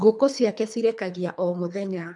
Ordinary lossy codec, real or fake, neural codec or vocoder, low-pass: none; fake; codec, 24 kHz, 3 kbps, HILCodec; 10.8 kHz